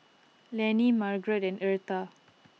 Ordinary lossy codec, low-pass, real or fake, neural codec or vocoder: none; none; real; none